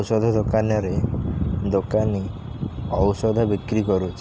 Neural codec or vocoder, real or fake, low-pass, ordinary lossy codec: none; real; none; none